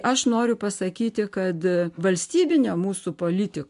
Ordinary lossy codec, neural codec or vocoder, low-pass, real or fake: MP3, 64 kbps; none; 10.8 kHz; real